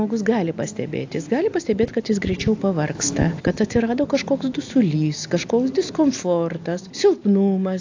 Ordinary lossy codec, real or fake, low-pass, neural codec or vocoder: AAC, 48 kbps; real; 7.2 kHz; none